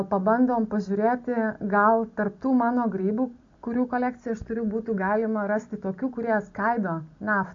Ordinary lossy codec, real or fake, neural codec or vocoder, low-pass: AAC, 48 kbps; real; none; 7.2 kHz